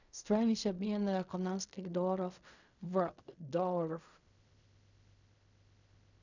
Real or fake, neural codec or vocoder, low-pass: fake; codec, 16 kHz in and 24 kHz out, 0.4 kbps, LongCat-Audio-Codec, fine tuned four codebook decoder; 7.2 kHz